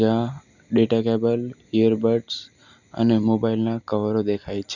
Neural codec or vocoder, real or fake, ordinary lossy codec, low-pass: none; real; none; 7.2 kHz